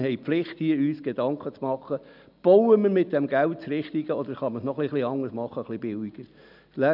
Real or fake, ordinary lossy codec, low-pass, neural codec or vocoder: real; none; 5.4 kHz; none